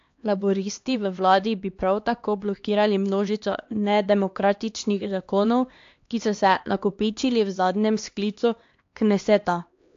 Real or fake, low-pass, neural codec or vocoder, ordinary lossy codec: fake; 7.2 kHz; codec, 16 kHz, 2 kbps, X-Codec, HuBERT features, trained on LibriSpeech; AAC, 48 kbps